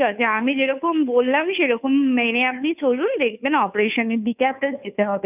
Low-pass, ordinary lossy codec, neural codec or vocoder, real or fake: 3.6 kHz; none; codec, 16 kHz, 2 kbps, FunCodec, trained on Chinese and English, 25 frames a second; fake